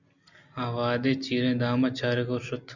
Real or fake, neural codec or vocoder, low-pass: real; none; 7.2 kHz